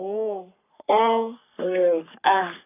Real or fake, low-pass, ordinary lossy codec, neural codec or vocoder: fake; 3.6 kHz; none; codec, 44.1 kHz, 3.4 kbps, Pupu-Codec